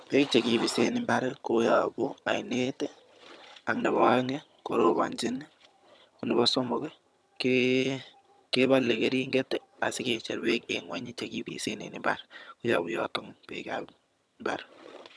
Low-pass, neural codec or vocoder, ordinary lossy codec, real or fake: none; vocoder, 22.05 kHz, 80 mel bands, HiFi-GAN; none; fake